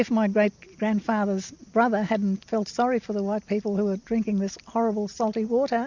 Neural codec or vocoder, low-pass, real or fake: none; 7.2 kHz; real